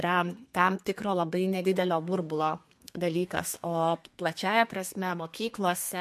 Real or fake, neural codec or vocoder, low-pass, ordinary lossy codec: fake; codec, 32 kHz, 1.9 kbps, SNAC; 14.4 kHz; MP3, 64 kbps